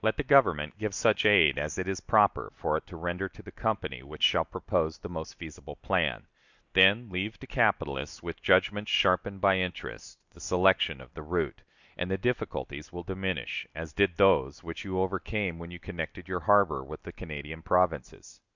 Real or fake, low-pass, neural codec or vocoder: real; 7.2 kHz; none